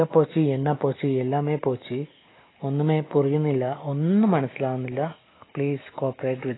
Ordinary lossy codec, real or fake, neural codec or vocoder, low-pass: AAC, 16 kbps; real; none; 7.2 kHz